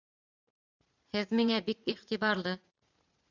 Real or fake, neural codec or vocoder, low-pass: fake; vocoder, 44.1 kHz, 80 mel bands, Vocos; 7.2 kHz